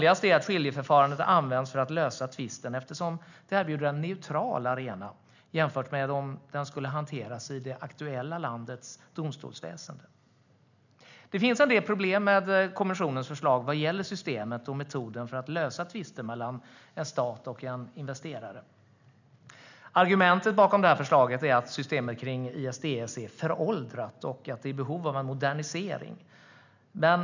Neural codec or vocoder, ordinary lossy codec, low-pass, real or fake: none; none; 7.2 kHz; real